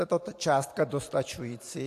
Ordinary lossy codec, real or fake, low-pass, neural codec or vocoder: AAC, 64 kbps; fake; 14.4 kHz; vocoder, 44.1 kHz, 128 mel bands every 512 samples, BigVGAN v2